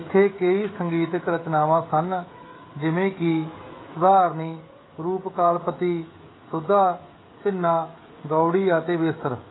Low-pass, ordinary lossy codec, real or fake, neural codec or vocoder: 7.2 kHz; AAC, 16 kbps; fake; codec, 16 kHz, 16 kbps, FreqCodec, smaller model